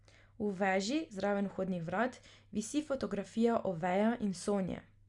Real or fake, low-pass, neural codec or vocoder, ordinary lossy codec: real; 10.8 kHz; none; Opus, 64 kbps